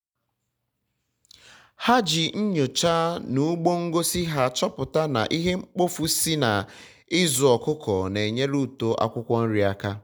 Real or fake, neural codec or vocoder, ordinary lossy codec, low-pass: real; none; none; none